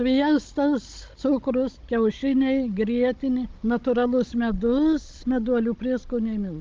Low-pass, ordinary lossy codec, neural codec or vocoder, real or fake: 7.2 kHz; Opus, 32 kbps; codec, 16 kHz, 16 kbps, FunCodec, trained on Chinese and English, 50 frames a second; fake